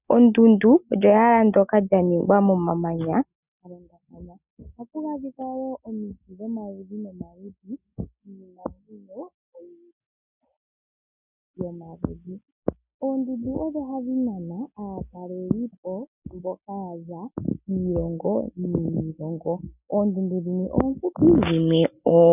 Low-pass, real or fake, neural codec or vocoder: 3.6 kHz; real; none